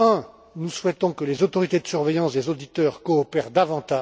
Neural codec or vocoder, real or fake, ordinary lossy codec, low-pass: none; real; none; none